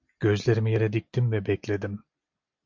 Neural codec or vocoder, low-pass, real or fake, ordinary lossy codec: none; 7.2 kHz; real; MP3, 64 kbps